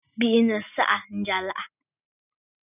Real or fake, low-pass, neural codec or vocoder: real; 3.6 kHz; none